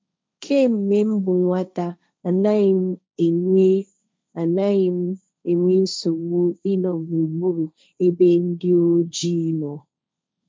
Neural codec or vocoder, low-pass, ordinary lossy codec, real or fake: codec, 16 kHz, 1.1 kbps, Voila-Tokenizer; none; none; fake